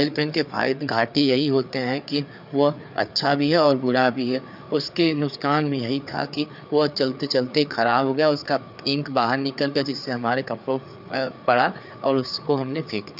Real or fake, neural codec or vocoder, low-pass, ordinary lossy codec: fake; codec, 16 kHz, 4 kbps, FreqCodec, larger model; 5.4 kHz; none